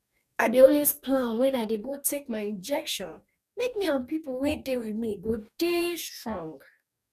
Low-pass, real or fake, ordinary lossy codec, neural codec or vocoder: 14.4 kHz; fake; none; codec, 44.1 kHz, 2.6 kbps, DAC